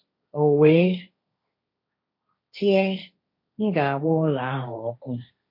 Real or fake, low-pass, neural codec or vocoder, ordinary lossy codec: fake; 5.4 kHz; codec, 16 kHz, 1.1 kbps, Voila-Tokenizer; MP3, 32 kbps